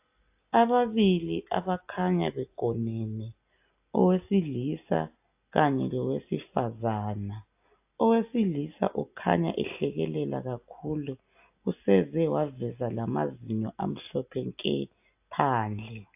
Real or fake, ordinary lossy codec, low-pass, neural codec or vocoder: real; AAC, 32 kbps; 3.6 kHz; none